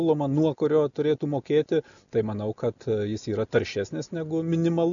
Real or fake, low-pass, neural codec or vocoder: real; 7.2 kHz; none